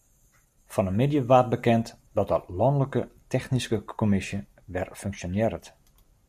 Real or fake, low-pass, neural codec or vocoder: real; 14.4 kHz; none